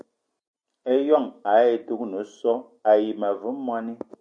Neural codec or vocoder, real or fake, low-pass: none; real; 9.9 kHz